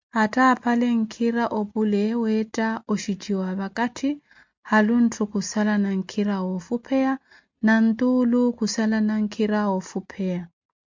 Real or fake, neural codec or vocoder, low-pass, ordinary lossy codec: real; none; 7.2 kHz; MP3, 64 kbps